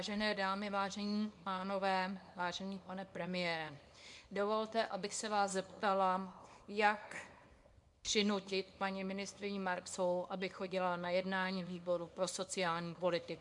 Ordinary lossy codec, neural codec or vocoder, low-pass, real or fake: MP3, 64 kbps; codec, 24 kHz, 0.9 kbps, WavTokenizer, small release; 10.8 kHz; fake